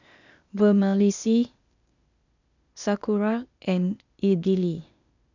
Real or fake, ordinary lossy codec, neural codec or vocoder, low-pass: fake; none; codec, 16 kHz, 0.8 kbps, ZipCodec; 7.2 kHz